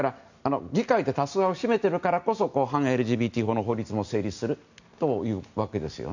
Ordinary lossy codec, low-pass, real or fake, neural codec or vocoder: none; 7.2 kHz; real; none